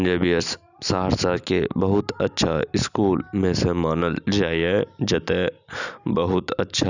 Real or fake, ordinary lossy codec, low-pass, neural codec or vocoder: real; none; 7.2 kHz; none